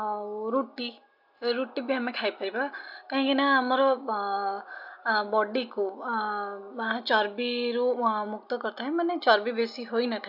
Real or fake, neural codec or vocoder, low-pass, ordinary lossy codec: real; none; 5.4 kHz; AAC, 48 kbps